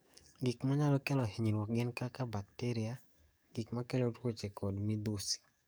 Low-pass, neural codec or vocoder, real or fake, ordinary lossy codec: none; codec, 44.1 kHz, 7.8 kbps, DAC; fake; none